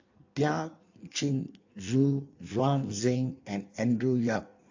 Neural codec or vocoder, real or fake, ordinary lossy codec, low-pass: codec, 16 kHz in and 24 kHz out, 1.1 kbps, FireRedTTS-2 codec; fake; AAC, 48 kbps; 7.2 kHz